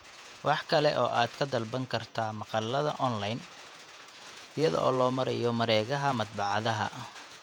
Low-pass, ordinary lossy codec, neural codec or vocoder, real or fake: 19.8 kHz; none; vocoder, 48 kHz, 128 mel bands, Vocos; fake